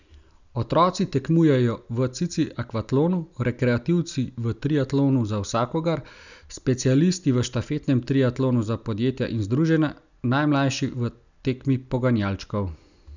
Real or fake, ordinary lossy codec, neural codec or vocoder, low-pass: real; none; none; 7.2 kHz